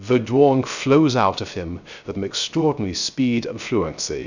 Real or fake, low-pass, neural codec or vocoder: fake; 7.2 kHz; codec, 16 kHz, 0.3 kbps, FocalCodec